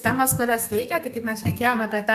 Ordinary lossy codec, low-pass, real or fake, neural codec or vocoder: MP3, 96 kbps; 14.4 kHz; fake; codec, 32 kHz, 1.9 kbps, SNAC